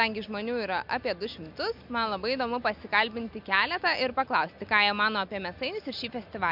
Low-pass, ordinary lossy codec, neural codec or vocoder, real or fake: 5.4 kHz; MP3, 48 kbps; none; real